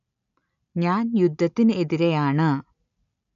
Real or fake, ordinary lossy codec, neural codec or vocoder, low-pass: real; none; none; 7.2 kHz